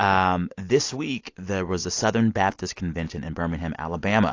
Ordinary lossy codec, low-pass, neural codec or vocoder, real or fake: AAC, 48 kbps; 7.2 kHz; none; real